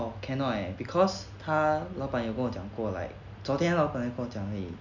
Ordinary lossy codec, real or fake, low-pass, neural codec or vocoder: none; real; 7.2 kHz; none